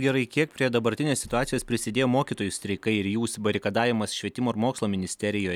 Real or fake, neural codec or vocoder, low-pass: real; none; 19.8 kHz